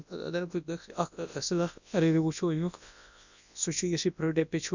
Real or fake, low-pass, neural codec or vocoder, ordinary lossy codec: fake; 7.2 kHz; codec, 24 kHz, 0.9 kbps, WavTokenizer, large speech release; none